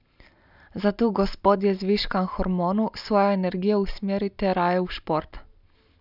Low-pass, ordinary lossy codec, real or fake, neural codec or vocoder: 5.4 kHz; none; real; none